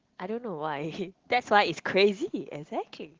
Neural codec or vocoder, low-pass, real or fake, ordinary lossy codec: none; 7.2 kHz; real; Opus, 16 kbps